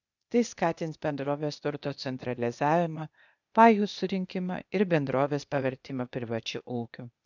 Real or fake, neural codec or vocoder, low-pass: fake; codec, 16 kHz, 0.8 kbps, ZipCodec; 7.2 kHz